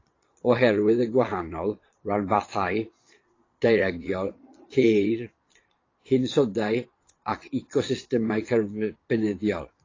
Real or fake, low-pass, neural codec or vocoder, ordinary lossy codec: fake; 7.2 kHz; vocoder, 22.05 kHz, 80 mel bands, Vocos; AAC, 32 kbps